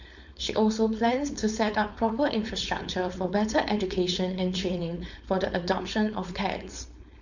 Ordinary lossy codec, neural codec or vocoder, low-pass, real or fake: none; codec, 16 kHz, 4.8 kbps, FACodec; 7.2 kHz; fake